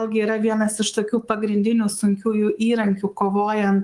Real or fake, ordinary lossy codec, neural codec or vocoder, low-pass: fake; Opus, 24 kbps; codec, 24 kHz, 3.1 kbps, DualCodec; 10.8 kHz